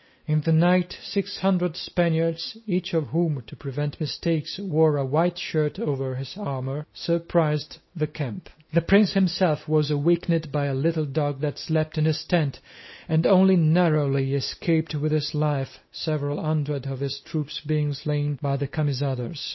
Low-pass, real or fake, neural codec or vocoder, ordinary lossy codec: 7.2 kHz; real; none; MP3, 24 kbps